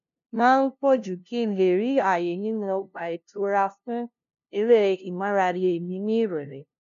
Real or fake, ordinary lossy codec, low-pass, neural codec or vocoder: fake; AAC, 96 kbps; 7.2 kHz; codec, 16 kHz, 0.5 kbps, FunCodec, trained on LibriTTS, 25 frames a second